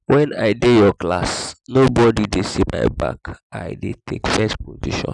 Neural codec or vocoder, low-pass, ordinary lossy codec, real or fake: none; 10.8 kHz; none; real